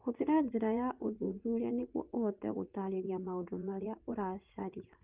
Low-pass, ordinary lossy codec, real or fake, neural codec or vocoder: 3.6 kHz; Opus, 32 kbps; fake; vocoder, 44.1 kHz, 80 mel bands, Vocos